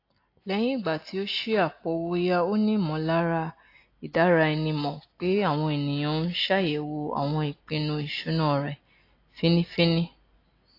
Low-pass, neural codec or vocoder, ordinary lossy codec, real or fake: 5.4 kHz; none; AAC, 32 kbps; real